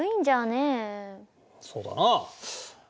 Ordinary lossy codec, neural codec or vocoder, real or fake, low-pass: none; none; real; none